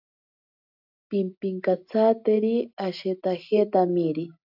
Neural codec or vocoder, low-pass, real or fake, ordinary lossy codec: none; 5.4 kHz; real; AAC, 48 kbps